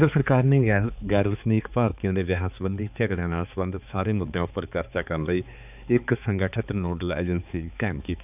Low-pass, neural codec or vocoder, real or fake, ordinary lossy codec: 3.6 kHz; codec, 16 kHz, 4 kbps, X-Codec, HuBERT features, trained on balanced general audio; fake; none